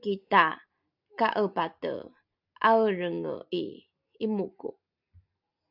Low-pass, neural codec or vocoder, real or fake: 5.4 kHz; none; real